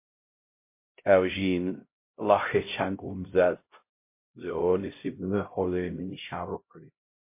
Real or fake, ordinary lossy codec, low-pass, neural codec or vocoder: fake; MP3, 24 kbps; 3.6 kHz; codec, 16 kHz, 0.5 kbps, X-Codec, HuBERT features, trained on LibriSpeech